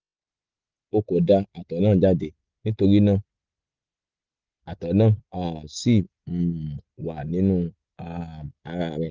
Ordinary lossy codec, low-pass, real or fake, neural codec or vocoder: Opus, 24 kbps; 7.2 kHz; real; none